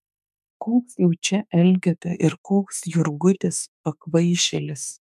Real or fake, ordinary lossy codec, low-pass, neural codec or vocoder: fake; MP3, 96 kbps; 14.4 kHz; autoencoder, 48 kHz, 32 numbers a frame, DAC-VAE, trained on Japanese speech